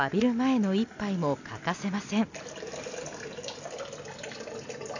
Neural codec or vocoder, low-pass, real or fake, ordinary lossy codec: none; 7.2 kHz; real; none